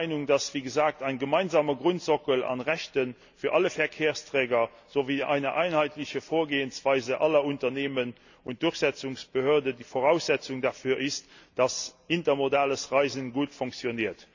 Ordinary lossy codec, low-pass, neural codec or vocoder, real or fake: none; 7.2 kHz; none; real